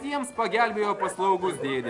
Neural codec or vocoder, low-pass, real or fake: none; 10.8 kHz; real